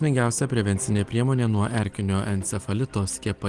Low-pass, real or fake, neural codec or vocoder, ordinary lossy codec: 10.8 kHz; real; none; Opus, 24 kbps